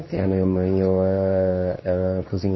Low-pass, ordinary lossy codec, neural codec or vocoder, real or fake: 7.2 kHz; MP3, 24 kbps; codec, 24 kHz, 0.9 kbps, WavTokenizer, medium speech release version 2; fake